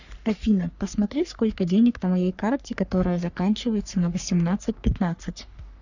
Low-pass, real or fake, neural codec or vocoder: 7.2 kHz; fake; codec, 44.1 kHz, 3.4 kbps, Pupu-Codec